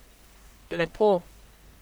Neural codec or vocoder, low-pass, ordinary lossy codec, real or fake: codec, 44.1 kHz, 1.7 kbps, Pupu-Codec; none; none; fake